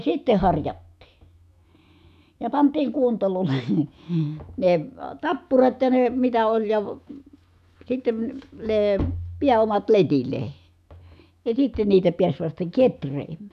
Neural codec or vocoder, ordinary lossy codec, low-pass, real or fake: autoencoder, 48 kHz, 128 numbers a frame, DAC-VAE, trained on Japanese speech; none; 14.4 kHz; fake